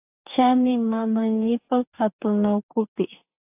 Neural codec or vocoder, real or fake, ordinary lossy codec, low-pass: codec, 44.1 kHz, 2.6 kbps, DAC; fake; AAC, 32 kbps; 3.6 kHz